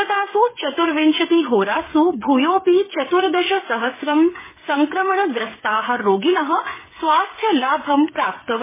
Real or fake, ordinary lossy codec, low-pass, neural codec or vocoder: fake; MP3, 16 kbps; 3.6 kHz; codec, 16 kHz, 8 kbps, FreqCodec, smaller model